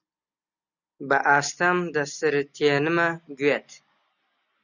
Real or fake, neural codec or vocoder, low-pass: real; none; 7.2 kHz